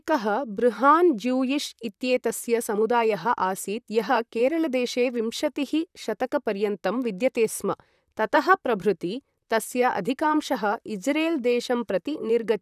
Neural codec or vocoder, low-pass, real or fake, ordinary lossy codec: vocoder, 44.1 kHz, 128 mel bands, Pupu-Vocoder; 14.4 kHz; fake; none